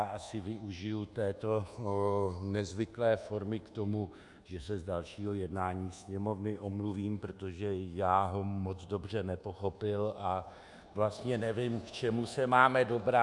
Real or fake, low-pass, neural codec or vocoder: fake; 10.8 kHz; codec, 24 kHz, 1.2 kbps, DualCodec